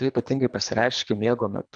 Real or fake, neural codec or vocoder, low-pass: fake; codec, 24 kHz, 3 kbps, HILCodec; 9.9 kHz